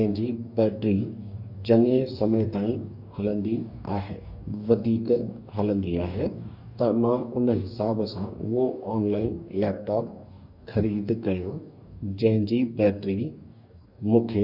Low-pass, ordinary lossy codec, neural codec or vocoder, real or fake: 5.4 kHz; none; codec, 44.1 kHz, 2.6 kbps, DAC; fake